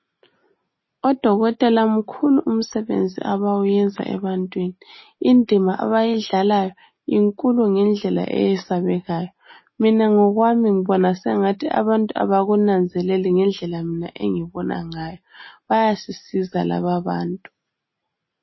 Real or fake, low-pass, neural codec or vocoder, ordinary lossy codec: real; 7.2 kHz; none; MP3, 24 kbps